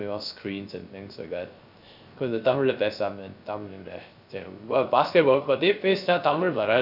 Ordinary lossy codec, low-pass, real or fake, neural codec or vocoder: none; 5.4 kHz; fake; codec, 16 kHz, 0.3 kbps, FocalCodec